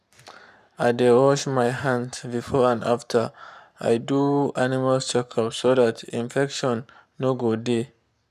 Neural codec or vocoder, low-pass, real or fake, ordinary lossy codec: codec, 44.1 kHz, 7.8 kbps, Pupu-Codec; 14.4 kHz; fake; none